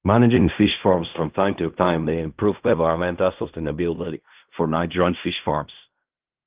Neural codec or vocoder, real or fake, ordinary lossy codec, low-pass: codec, 16 kHz in and 24 kHz out, 0.4 kbps, LongCat-Audio-Codec, fine tuned four codebook decoder; fake; Opus, 32 kbps; 3.6 kHz